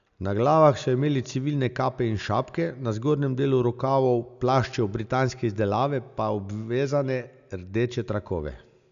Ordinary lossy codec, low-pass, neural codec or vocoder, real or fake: none; 7.2 kHz; none; real